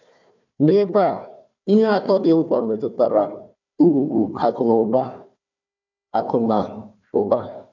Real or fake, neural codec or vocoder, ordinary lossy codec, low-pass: fake; codec, 16 kHz, 1 kbps, FunCodec, trained on Chinese and English, 50 frames a second; none; 7.2 kHz